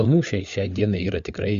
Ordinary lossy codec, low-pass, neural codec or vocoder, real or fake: Opus, 64 kbps; 7.2 kHz; codec, 16 kHz, 4 kbps, FunCodec, trained on LibriTTS, 50 frames a second; fake